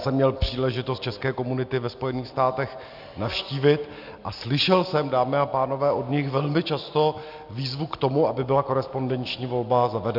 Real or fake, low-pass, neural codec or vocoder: real; 5.4 kHz; none